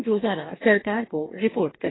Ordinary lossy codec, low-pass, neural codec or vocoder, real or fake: AAC, 16 kbps; 7.2 kHz; codec, 44.1 kHz, 2.6 kbps, DAC; fake